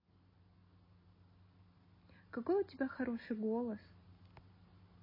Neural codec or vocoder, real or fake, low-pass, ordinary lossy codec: none; real; 5.4 kHz; MP3, 24 kbps